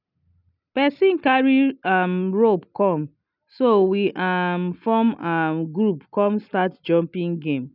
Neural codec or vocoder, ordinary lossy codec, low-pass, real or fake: none; none; 5.4 kHz; real